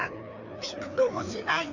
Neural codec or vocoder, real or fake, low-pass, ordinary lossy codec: codec, 16 kHz, 2 kbps, FreqCodec, larger model; fake; 7.2 kHz; none